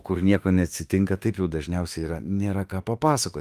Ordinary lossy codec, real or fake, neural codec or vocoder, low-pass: Opus, 32 kbps; fake; autoencoder, 48 kHz, 32 numbers a frame, DAC-VAE, trained on Japanese speech; 14.4 kHz